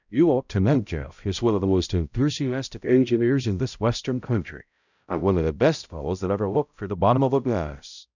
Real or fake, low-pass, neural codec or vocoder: fake; 7.2 kHz; codec, 16 kHz, 0.5 kbps, X-Codec, HuBERT features, trained on balanced general audio